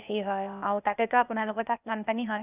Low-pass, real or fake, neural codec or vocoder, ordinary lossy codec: 3.6 kHz; fake; codec, 16 kHz, 0.8 kbps, ZipCodec; none